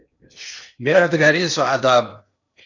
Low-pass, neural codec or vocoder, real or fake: 7.2 kHz; codec, 16 kHz in and 24 kHz out, 0.8 kbps, FocalCodec, streaming, 65536 codes; fake